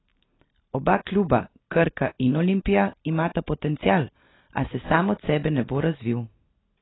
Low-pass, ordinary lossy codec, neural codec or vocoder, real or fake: 7.2 kHz; AAC, 16 kbps; none; real